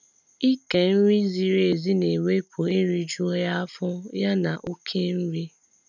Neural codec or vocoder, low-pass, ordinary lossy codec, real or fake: none; 7.2 kHz; none; real